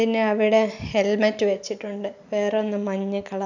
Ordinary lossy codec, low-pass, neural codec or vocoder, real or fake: none; 7.2 kHz; none; real